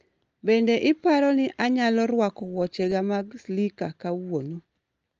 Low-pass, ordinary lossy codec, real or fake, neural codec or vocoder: 7.2 kHz; Opus, 24 kbps; real; none